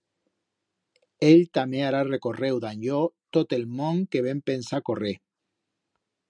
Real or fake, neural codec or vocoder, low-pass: real; none; 9.9 kHz